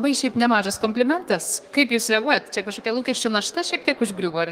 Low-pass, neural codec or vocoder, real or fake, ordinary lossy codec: 14.4 kHz; codec, 32 kHz, 1.9 kbps, SNAC; fake; Opus, 24 kbps